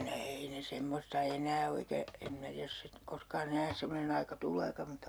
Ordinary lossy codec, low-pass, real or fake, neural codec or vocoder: none; none; real; none